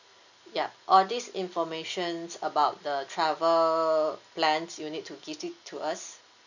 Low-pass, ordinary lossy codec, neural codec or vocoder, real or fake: 7.2 kHz; none; none; real